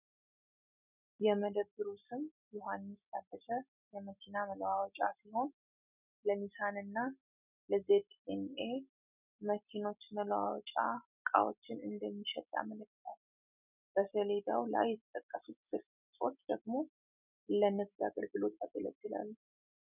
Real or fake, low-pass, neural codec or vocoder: real; 3.6 kHz; none